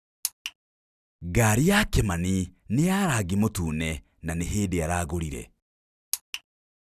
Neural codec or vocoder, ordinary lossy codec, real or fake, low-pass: none; none; real; 14.4 kHz